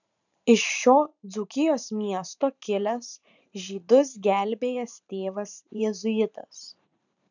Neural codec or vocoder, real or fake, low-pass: vocoder, 44.1 kHz, 128 mel bands every 512 samples, BigVGAN v2; fake; 7.2 kHz